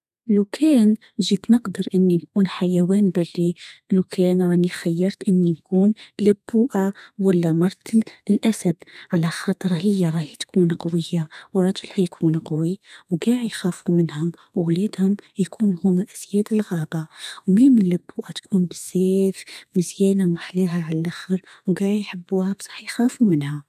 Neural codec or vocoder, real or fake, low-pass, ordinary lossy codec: codec, 32 kHz, 1.9 kbps, SNAC; fake; 14.4 kHz; none